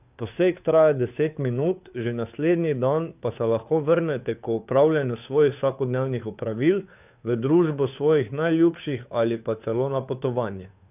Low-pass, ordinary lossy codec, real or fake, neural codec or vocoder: 3.6 kHz; none; fake; codec, 16 kHz, 2 kbps, FunCodec, trained on Chinese and English, 25 frames a second